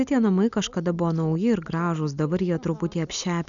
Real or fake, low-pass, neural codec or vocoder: real; 7.2 kHz; none